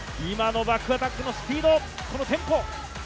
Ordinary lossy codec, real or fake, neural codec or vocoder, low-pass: none; real; none; none